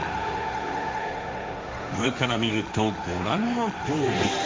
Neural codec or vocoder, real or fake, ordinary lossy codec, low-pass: codec, 16 kHz, 1.1 kbps, Voila-Tokenizer; fake; none; none